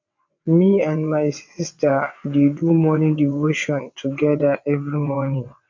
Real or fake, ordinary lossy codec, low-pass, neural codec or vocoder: fake; MP3, 64 kbps; 7.2 kHz; vocoder, 22.05 kHz, 80 mel bands, WaveNeXt